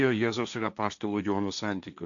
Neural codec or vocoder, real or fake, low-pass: codec, 16 kHz, 1.1 kbps, Voila-Tokenizer; fake; 7.2 kHz